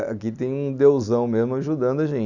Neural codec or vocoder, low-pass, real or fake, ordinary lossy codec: none; 7.2 kHz; real; none